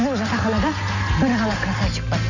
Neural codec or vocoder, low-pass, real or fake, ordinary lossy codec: none; 7.2 kHz; real; none